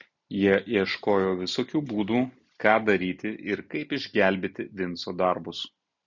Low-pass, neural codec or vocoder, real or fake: 7.2 kHz; none; real